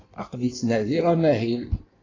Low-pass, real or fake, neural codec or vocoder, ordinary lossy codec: 7.2 kHz; fake; codec, 16 kHz in and 24 kHz out, 1.1 kbps, FireRedTTS-2 codec; AAC, 32 kbps